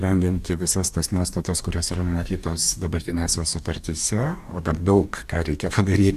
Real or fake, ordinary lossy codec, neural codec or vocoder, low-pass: fake; AAC, 96 kbps; codec, 44.1 kHz, 2.6 kbps, DAC; 14.4 kHz